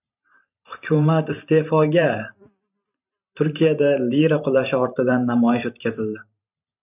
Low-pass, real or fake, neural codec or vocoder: 3.6 kHz; fake; vocoder, 44.1 kHz, 128 mel bands every 512 samples, BigVGAN v2